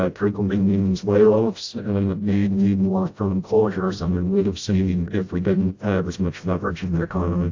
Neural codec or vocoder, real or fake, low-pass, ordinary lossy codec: codec, 16 kHz, 0.5 kbps, FreqCodec, smaller model; fake; 7.2 kHz; AAC, 48 kbps